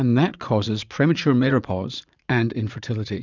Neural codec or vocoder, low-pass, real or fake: vocoder, 44.1 kHz, 80 mel bands, Vocos; 7.2 kHz; fake